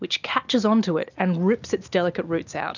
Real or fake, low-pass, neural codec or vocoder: real; 7.2 kHz; none